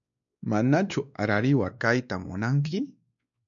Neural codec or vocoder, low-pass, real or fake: codec, 16 kHz, 2 kbps, X-Codec, WavLM features, trained on Multilingual LibriSpeech; 7.2 kHz; fake